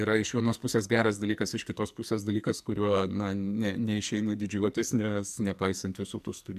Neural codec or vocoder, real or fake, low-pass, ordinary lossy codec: codec, 44.1 kHz, 2.6 kbps, SNAC; fake; 14.4 kHz; AAC, 96 kbps